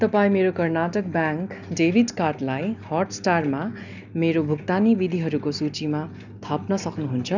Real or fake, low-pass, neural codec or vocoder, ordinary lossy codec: real; 7.2 kHz; none; none